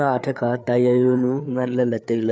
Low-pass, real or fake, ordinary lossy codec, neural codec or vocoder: none; fake; none; codec, 16 kHz, 4 kbps, FreqCodec, larger model